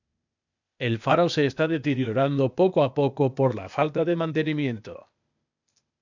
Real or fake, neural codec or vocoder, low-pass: fake; codec, 16 kHz, 0.8 kbps, ZipCodec; 7.2 kHz